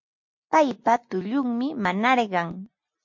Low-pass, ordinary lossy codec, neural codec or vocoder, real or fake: 7.2 kHz; MP3, 48 kbps; none; real